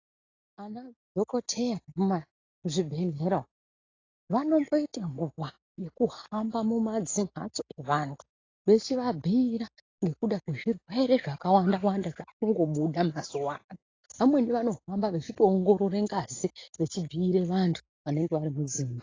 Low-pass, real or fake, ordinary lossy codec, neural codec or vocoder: 7.2 kHz; real; AAC, 32 kbps; none